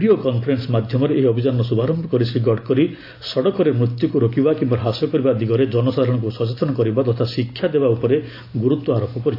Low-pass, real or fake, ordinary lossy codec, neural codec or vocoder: 5.4 kHz; real; AAC, 32 kbps; none